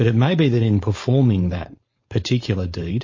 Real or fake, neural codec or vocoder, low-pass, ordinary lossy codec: fake; codec, 16 kHz, 4.8 kbps, FACodec; 7.2 kHz; MP3, 32 kbps